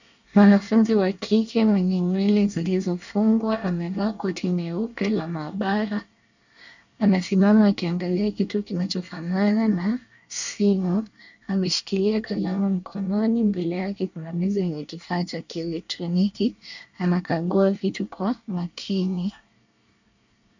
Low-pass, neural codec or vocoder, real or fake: 7.2 kHz; codec, 24 kHz, 1 kbps, SNAC; fake